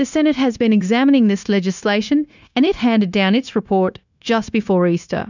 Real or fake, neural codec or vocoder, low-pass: fake; codec, 16 kHz, 0.9 kbps, LongCat-Audio-Codec; 7.2 kHz